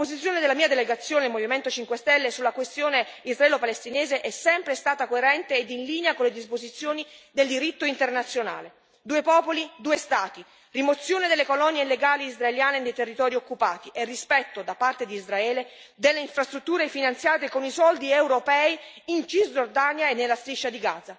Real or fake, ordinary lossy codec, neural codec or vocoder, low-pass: real; none; none; none